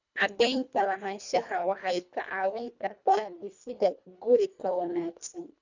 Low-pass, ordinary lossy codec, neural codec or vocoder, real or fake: 7.2 kHz; none; codec, 24 kHz, 1.5 kbps, HILCodec; fake